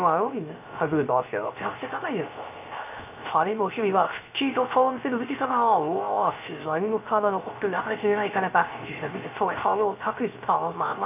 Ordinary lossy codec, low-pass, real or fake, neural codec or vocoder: none; 3.6 kHz; fake; codec, 16 kHz, 0.3 kbps, FocalCodec